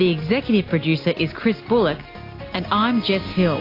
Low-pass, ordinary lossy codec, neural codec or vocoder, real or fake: 5.4 kHz; AAC, 32 kbps; none; real